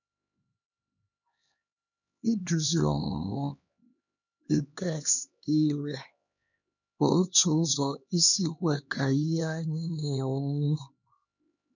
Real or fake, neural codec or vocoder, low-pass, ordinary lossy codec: fake; codec, 16 kHz, 2 kbps, X-Codec, HuBERT features, trained on LibriSpeech; 7.2 kHz; none